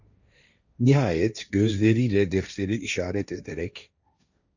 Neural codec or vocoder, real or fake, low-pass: codec, 16 kHz, 1.1 kbps, Voila-Tokenizer; fake; 7.2 kHz